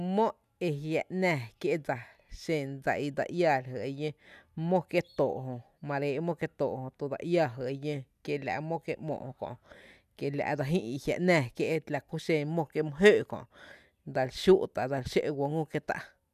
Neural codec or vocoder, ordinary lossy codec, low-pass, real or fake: none; none; 14.4 kHz; real